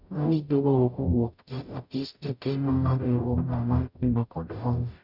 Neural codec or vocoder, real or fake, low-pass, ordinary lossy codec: codec, 44.1 kHz, 0.9 kbps, DAC; fake; 5.4 kHz; none